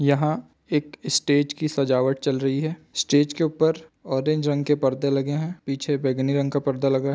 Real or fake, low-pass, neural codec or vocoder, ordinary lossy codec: real; none; none; none